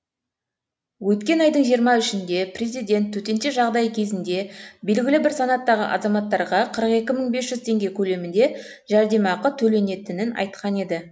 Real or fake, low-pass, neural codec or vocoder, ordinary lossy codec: real; none; none; none